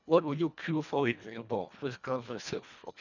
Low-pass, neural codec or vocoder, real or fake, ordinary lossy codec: 7.2 kHz; codec, 24 kHz, 1.5 kbps, HILCodec; fake; none